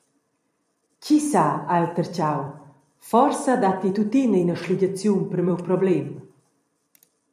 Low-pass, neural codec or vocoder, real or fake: 14.4 kHz; vocoder, 44.1 kHz, 128 mel bands every 256 samples, BigVGAN v2; fake